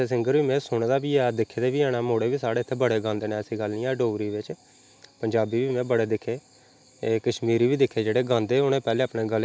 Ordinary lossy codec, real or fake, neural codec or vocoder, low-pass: none; real; none; none